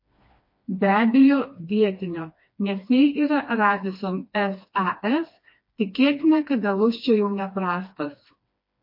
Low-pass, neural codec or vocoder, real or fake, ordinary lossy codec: 5.4 kHz; codec, 16 kHz, 2 kbps, FreqCodec, smaller model; fake; MP3, 32 kbps